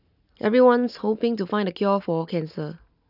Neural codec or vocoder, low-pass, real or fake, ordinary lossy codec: none; 5.4 kHz; real; none